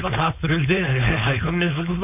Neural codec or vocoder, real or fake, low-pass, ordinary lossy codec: codec, 16 kHz, 4.8 kbps, FACodec; fake; 3.6 kHz; none